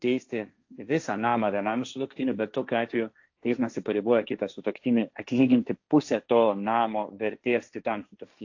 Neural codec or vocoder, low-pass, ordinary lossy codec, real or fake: codec, 16 kHz, 1.1 kbps, Voila-Tokenizer; 7.2 kHz; AAC, 48 kbps; fake